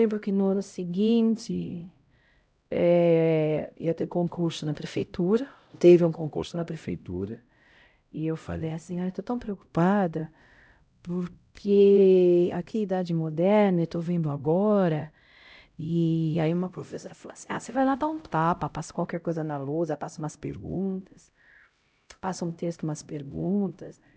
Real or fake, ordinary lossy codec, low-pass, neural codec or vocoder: fake; none; none; codec, 16 kHz, 0.5 kbps, X-Codec, HuBERT features, trained on LibriSpeech